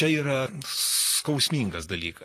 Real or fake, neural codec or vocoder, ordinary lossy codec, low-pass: fake; vocoder, 44.1 kHz, 128 mel bands, Pupu-Vocoder; AAC, 48 kbps; 14.4 kHz